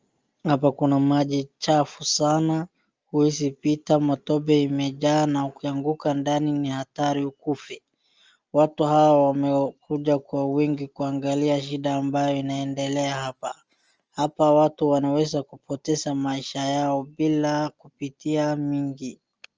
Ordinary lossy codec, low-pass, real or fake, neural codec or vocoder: Opus, 24 kbps; 7.2 kHz; real; none